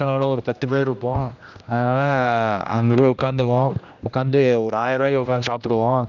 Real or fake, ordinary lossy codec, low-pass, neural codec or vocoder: fake; none; 7.2 kHz; codec, 16 kHz, 1 kbps, X-Codec, HuBERT features, trained on general audio